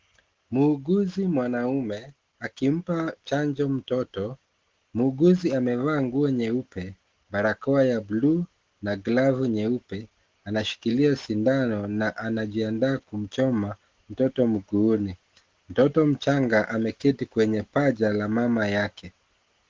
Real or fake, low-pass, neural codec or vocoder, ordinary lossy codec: real; 7.2 kHz; none; Opus, 16 kbps